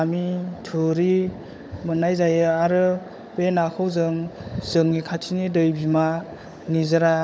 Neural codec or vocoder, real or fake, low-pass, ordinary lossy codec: codec, 16 kHz, 16 kbps, FunCodec, trained on LibriTTS, 50 frames a second; fake; none; none